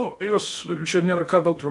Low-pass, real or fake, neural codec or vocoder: 10.8 kHz; fake; codec, 16 kHz in and 24 kHz out, 0.6 kbps, FocalCodec, streaming, 2048 codes